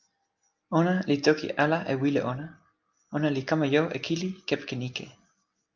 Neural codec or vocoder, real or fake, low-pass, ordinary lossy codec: none; real; 7.2 kHz; Opus, 24 kbps